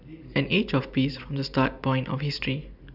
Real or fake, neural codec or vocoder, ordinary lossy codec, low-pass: real; none; none; 5.4 kHz